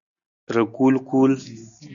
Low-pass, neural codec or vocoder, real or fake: 7.2 kHz; none; real